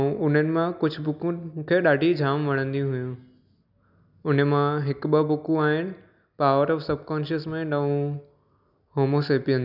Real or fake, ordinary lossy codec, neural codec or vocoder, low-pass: real; none; none; 5.4 kHz